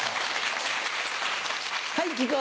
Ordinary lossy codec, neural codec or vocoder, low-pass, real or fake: none; none; none; real